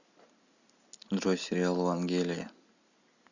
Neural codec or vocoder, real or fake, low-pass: none; real; 7.2 kHz